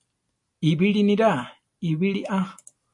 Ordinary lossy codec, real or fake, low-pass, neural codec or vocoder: MP3, 48 kbps; real; 10.8 kHz; none